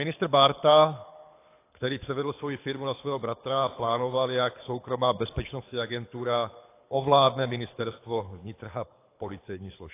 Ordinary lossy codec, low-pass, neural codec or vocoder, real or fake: AAC, 24 kbps; 3.6 kHz; codec, 24 kHz, 6 kbps, HILCodec; fake